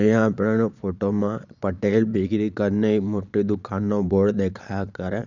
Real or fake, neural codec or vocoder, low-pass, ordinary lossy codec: fake; vocoder, 22.05 kHz, 80 mel bands, Vocos; 7.2 kHz; none